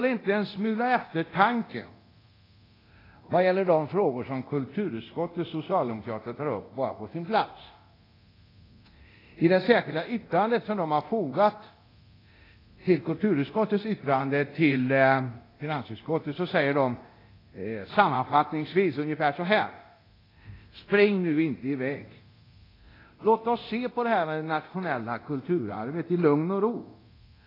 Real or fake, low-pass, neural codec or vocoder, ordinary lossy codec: fake; 5.4 kHz; codec, 24 kHz, 0.9 kbps, DualCodec; AAC, 24 kbps